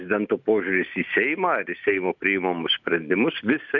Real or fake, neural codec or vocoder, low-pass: real; none; 7.2 kHz